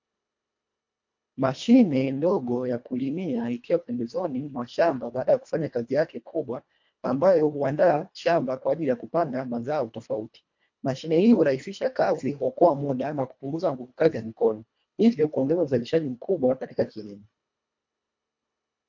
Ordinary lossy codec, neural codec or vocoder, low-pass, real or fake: MP3, 48 kbps; codec, 24 kHz, 1.5 kbps, HILCodec; 7.2 kHz; fake